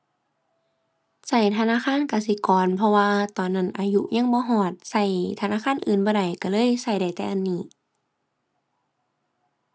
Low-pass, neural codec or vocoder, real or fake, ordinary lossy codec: none; none; real; none